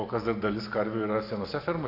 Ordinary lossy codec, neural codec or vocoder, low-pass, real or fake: AAC, 32 kbps; none; 5.4 kHz; real